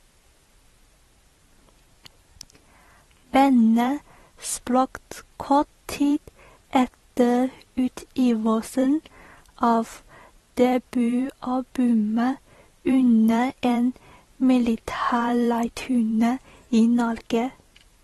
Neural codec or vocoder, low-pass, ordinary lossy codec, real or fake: vocoder, 44.1 kHz, 128 mel bands every 512 samples, BigVGAN v2; 19.8 kHz; AAC, 32 kbps; fake